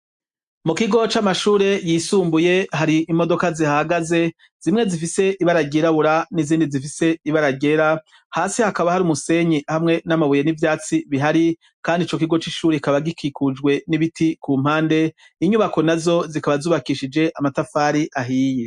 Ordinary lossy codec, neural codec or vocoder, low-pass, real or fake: MP3, 64 kbps; none; 10.8 kHz; real